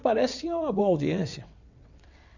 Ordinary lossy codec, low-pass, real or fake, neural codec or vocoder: none; 7.2 kHz; fake; vocoder, 22.05 kHz, 80 mel bands, WaveNeXt